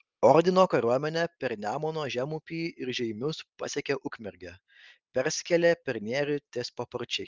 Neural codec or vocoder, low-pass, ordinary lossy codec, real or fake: none; 7.2 kHz; Opus, 32 kbps; real